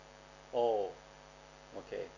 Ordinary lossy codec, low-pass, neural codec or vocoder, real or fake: none; 7.2 kHz; none; real